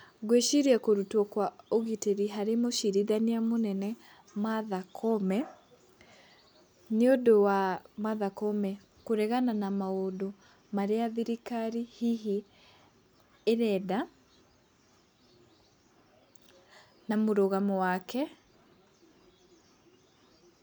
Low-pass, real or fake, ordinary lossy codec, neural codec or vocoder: none; real; none; none